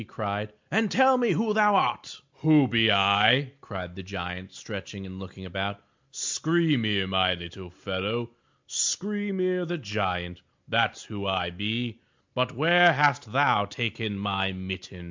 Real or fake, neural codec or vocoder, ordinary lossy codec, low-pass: real; none; MP3, 64 kbps; 7.2 kHz